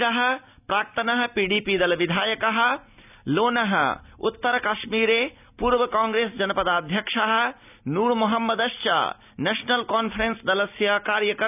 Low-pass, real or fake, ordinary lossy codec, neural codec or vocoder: 3.6 kHz; real; none; none